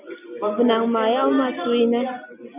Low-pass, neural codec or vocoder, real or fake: 3.6 kHz; none; real